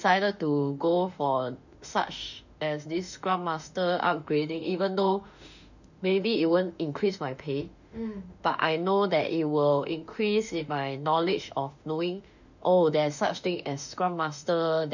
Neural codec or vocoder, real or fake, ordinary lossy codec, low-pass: autoencoder, 48 kHz, 32 numbers a frame, DAC-VAE, trained on Japanese speech; fake; none; 7.2 kHz